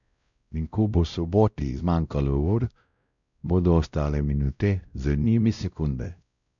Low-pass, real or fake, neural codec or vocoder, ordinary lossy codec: 7.2 kHz; fake; codec, 16 kHz, 0.5 kbps, X-Codec, WavLM features, trained on Multilingual LibriSpeech; none